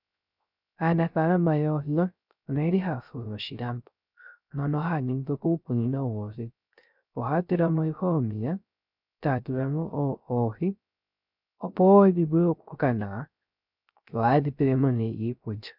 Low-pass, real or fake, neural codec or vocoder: 5.4 kHz; fake; codec, 16 kHz, 0.3 kbps, FocalCodec